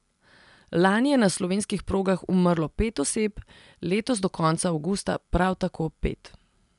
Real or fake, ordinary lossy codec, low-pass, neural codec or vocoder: real; none; 10.8 kHz; none